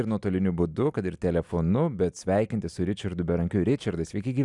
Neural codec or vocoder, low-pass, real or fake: none; 10.8 kHz; real